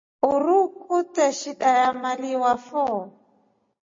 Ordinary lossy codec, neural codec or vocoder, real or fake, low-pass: MP3, 32 kbps; none; real; 7.2 kHz